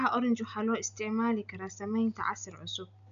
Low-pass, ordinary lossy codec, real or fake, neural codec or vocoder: 7.2 kHz; none; real; none